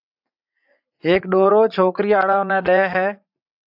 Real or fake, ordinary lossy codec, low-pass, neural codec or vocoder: fake; AAC, 48 kbps; 5.4 kHz; vocoder, 44.1 kHz, 80 mel bands, Vocos